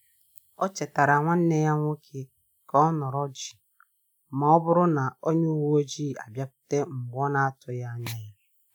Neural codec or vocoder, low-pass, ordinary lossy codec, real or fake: none; none; none; real